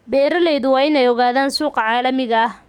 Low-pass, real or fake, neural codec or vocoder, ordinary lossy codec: 19.8 kHz; fake; codec, 44.1 kHz, 7.8 kbps, DAC; none